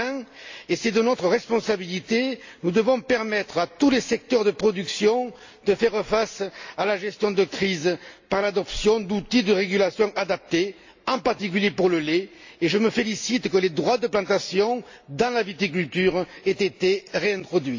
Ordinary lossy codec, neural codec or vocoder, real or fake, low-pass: AAC, 48 kbps; none; real; 7.2 kHz